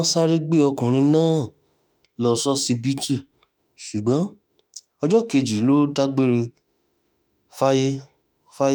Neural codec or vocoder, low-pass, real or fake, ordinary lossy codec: autoencoder, 48 kHz, 32 numbers a frame, DAC-VAE, trained on Japanese speech; none; fake; none